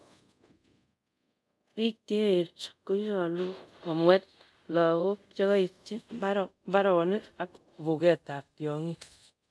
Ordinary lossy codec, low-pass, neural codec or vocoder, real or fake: none; none; codec, 24 kHz, 0.5 kbps, DualCodec; fake